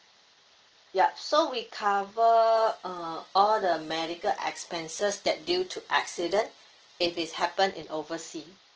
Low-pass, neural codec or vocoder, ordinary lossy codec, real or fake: 7.2 kHz; none; Opus, 16 kbps; real